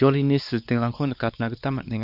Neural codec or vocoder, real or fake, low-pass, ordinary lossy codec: codec, 16 kHz, 2 kbps, X-Codec, WavLM features, trained on Multilingual LibriSpeech; fake; 5.4 kHz; none